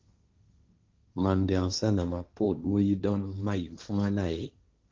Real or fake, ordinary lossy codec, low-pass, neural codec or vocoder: fake; Opus, 32 kbps; 7.2 kHz; codec, 16 kHz, 1.1 kbps, Voila-Tokenizer